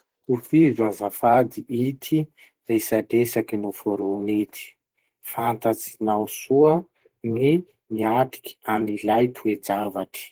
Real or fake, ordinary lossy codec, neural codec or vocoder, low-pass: fake; Opus, 16 kbps; vocoder, 44.1 kHz, 128 mel bands every 512 samples, BigVGAN v2; 19.8 kHz